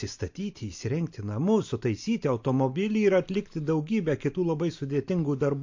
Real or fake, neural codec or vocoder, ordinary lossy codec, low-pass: real; none; MP3, 48 kbps; 7.2 kHz